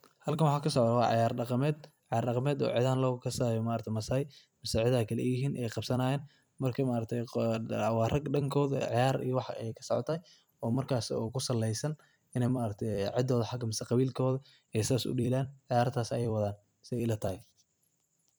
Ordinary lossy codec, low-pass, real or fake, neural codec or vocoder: none; none; fake; vocoder, 44.1 kHz, 128 mel bands every 256 samples, BigVGAN v2